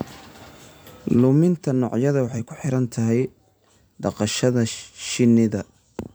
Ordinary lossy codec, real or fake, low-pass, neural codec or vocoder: none; fake; none; vocoder, 44.1 kHz, 128 mel bands every 256 samples, BigVGAN v2